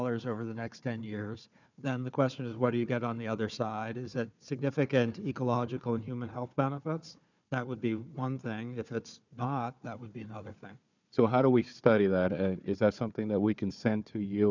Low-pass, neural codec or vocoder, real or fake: 7.2 kHz; codec, 16 kHz, 4 kbps, FunCodec, trained on Chinese and English, 50 frames a second; fake